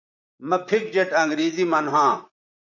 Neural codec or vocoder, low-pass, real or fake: vocoder, 44.1 kHz, 128 mel bands, Pupu-Vocoder; 7.2 kHz; fake